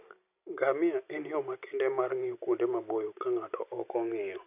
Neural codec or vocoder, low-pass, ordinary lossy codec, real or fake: vocoder, 44.1 kHz, 128 mel bands every 256 samples, BigVGAN v2; 3.6 kHz; none; fake